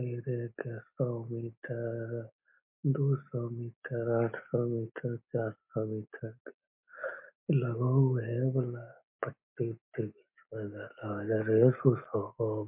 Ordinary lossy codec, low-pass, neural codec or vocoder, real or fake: MP3, 32 kbps; 3.6 kHz; none; real